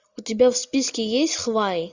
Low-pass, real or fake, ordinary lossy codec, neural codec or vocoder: 7.2 kHz; real; Opus, 64 kbps; none